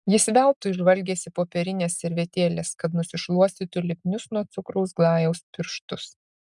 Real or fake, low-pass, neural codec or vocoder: real; 10.8 kHz; none